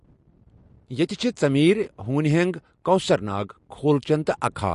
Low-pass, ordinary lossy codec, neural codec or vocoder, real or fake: 14.4 kHz; MP3, 48 kbps; none; real